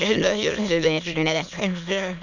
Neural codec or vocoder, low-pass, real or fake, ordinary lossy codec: autoencoder, 22.05 kHz, a latent of 192 numbers a frame, VITS, trained on many speakers; 7.2 kHz; fake; none